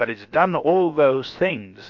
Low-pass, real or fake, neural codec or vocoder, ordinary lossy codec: 7.2 kHz; fake; codec, 16 kHz, about 1 kbps, DyCAST, with the encoder's durations; MP3, 48 kbps